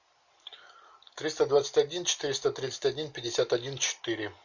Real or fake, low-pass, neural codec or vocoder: real; 7.2 kHz; none